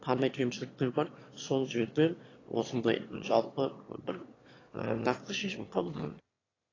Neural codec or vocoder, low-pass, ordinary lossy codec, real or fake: autoencoder, 22.05 kHz, a latent of 192 numbers a frame, VITS, trained on one speaker; 7.2 kHz; AAC, 32 kbps; fake